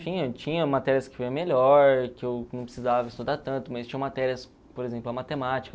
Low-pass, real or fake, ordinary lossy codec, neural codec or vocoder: none; real; none; none